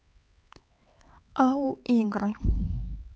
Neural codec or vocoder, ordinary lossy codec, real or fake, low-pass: codec, 16 kHz, 4 kbps, X-Codec, HuBERT features, trained on LibriSpeech; none; fake; none